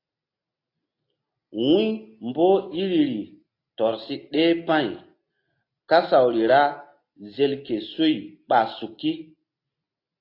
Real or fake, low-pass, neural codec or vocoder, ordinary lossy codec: real; 5.4 kHz; none; AAC, 32 kbps